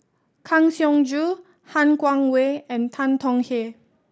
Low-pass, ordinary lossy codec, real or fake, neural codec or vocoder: none; none; real; none